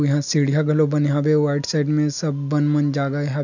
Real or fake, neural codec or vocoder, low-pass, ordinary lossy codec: real; none; 7.2 kHz; none